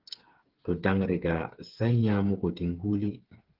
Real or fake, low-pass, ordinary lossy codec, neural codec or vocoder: fake; 5.4 kHz; Opus, 16 kbps; codec, 16 kHz, 8 kbps, FreqCodec, smaller model